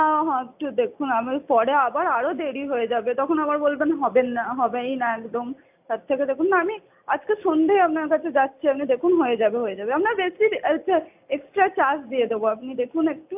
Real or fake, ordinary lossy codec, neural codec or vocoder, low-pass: real; AAC, 32 kbps; none; 3.6 kHz